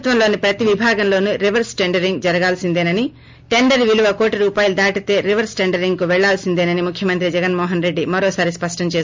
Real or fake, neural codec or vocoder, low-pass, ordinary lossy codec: real; none; 7.2 kHz; MP3, 64 kbps